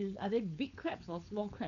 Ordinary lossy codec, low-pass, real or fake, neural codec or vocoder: none; 7.2 kHz; fake; codec, 16 kHz, 4.8 kbps, FACodec